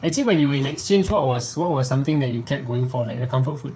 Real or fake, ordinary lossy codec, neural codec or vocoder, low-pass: fake; none; codec, 16 kHz, 4 kbps, FreqCodec, larger model; none